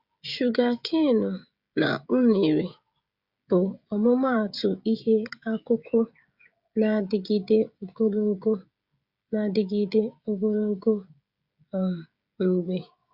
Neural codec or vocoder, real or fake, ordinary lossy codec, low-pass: codec, 16 kHz, 16 kbps, FreqCodec, smaller model; fake; Opus, 64 kbps; 5.4 kHz